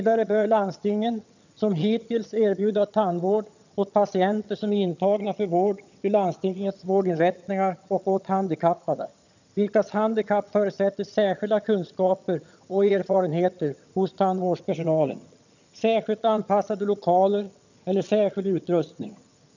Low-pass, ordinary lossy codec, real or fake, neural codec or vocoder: 7.2 kHz; none; fake; vocoder, 22.05 kHz, 80 mel bands, HiFi-GAN